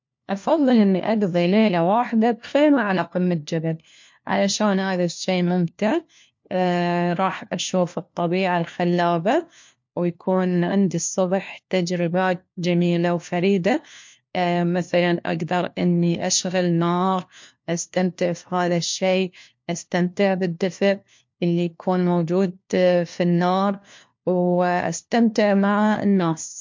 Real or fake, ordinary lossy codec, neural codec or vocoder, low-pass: fake; MP3, 48 kbps; codec, 16 kHz, 1 kbps, FunCodec, trained on LibriTTS, 50 frames a second; 7.2 kHz